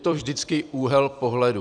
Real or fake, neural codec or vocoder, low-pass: fake; vocoder, 44.1 kHz, 128 mel bands every 256 samples, BigVGAN v2; 9.9 kHz